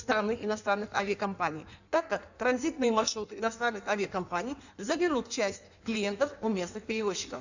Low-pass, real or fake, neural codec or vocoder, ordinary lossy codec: 7.2 kHz; fake; codec, 16 kHz in and 24 kHz out, 1.1 kbps, FireRedTTS-2 codec; none